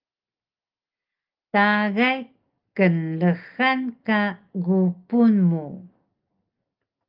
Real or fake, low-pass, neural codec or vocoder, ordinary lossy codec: real; 5.4 kHz; none; Opus, 24 kbps